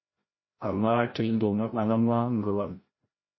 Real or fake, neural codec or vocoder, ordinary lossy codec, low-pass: fake; codec, 16 kHz, 0.5 kbps, FreqCodec, larger model; MP3, 24 kbps; 7.2 kHz